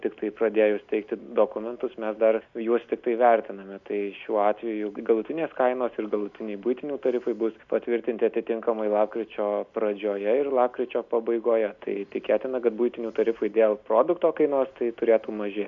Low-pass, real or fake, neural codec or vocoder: 7.2 kHz; real; none